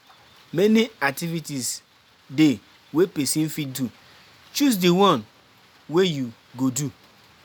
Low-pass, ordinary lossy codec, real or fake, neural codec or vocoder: none; none; real; none